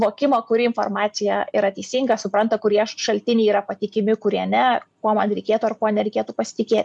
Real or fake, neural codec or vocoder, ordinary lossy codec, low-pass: real; none; MP3, 96 kbps; 10.8 kHz